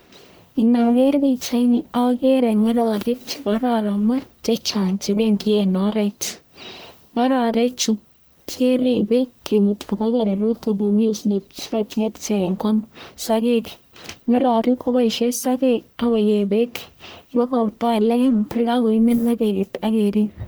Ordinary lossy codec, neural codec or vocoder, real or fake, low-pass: none; codec, 44.1 kHz, 1.7 kbps, Pupu-Codec; fake; none